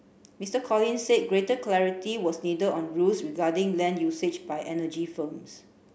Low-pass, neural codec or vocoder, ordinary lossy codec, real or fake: none; none; none; real